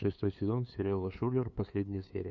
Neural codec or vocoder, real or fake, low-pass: codec, 16 kHz, 2 kbps, FunCodec, trained on LibriTTS, 25 frames a second; fake; 7.2 kHz